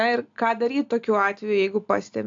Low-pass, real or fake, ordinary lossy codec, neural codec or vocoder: 7.2 kHz; real; AAC, 64 kbps; none